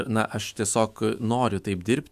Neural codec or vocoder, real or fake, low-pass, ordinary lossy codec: autoencoder, 48 kHz, 128 numbers a frame, DAC-VAE, trained on Japanese speech; fake; 14.4 kHz; MP3, 96 kbps